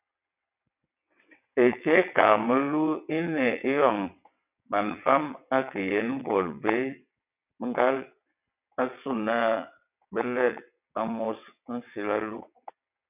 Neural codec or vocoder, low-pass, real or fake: vocoder, 22.05 kHz, 80 mel bands, WaveNeXt; 3.6 kHz; fake